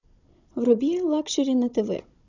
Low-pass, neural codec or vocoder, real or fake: 7.2 kHz; codec, 16 kHz, 16 kbps, FunCodec, trained on Chinese and English, 50 frames a second; fake